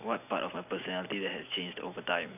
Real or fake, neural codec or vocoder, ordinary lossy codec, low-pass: real; none; none; 3.6 kHz